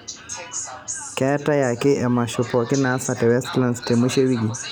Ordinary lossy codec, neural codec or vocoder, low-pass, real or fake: none; none; none; real